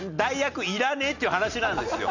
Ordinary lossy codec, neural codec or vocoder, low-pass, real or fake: none; none; 7.2 kHz; real